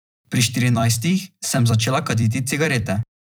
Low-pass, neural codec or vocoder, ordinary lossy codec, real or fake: none; vocoder, 44.1 kHz, 128 mel bands every 256 samples, BigVGAN v2; none; fake